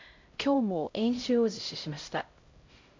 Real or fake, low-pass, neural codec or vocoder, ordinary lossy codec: fake; 7.2 kHz; codec, 16 kHz, 0.5 kbps, X-Codec, HuBERT features, trained on LibriSpeech; MP3, 48 kbps